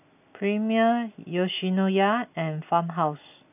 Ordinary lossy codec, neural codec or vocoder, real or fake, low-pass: none; none; real; 3.6 kHz